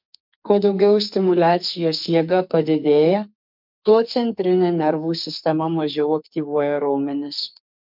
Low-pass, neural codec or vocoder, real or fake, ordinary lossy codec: 5.4 kHz; codec, 44.1 kHz, 2.6 kbps, SNAC; fake; MP3, 48 kbps